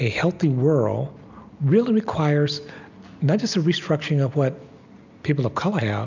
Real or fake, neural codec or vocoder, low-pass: real; none; 7.2 kHz